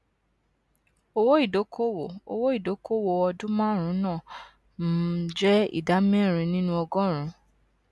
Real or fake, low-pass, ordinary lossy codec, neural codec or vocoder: real; none; none; none